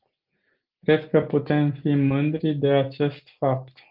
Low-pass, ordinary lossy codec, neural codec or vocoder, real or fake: 5.4 kHz; Opus, 24 kbps; none; real